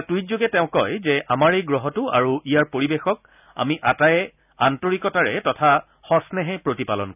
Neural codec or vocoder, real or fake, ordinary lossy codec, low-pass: none; real; none; 3.6 kHz